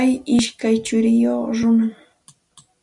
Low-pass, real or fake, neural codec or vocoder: 10.8 kHz; real; none